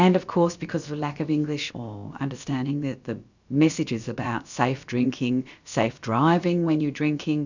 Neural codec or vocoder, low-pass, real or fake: codec, 16 kHz, about 1 kbps, DyCAST, with the encoder's durations; 7.2 kHz; fake